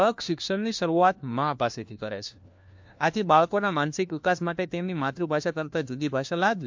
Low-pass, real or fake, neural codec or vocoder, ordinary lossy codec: 7.2 kHz; fake; codec, 16 kHz, 1 kbps, FunCodec, trained on LibriTTS, 50 frames a second; MP3, 48 kbps